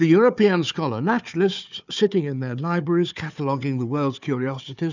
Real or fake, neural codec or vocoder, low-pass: fake; codec, 16 kHz, 4 kbps, FunCodec, trained on Chinese and English, 50 frames a second; 7.2 kHz